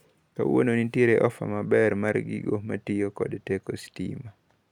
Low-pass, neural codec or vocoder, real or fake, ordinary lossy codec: 19.8 kHz; none; real; none